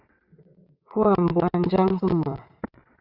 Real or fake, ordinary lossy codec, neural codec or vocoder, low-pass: fake; Opus, 64 kbps; vocoder, 22.05 kHz, 80 mel bands, WaveNeXt; 5.4 kHz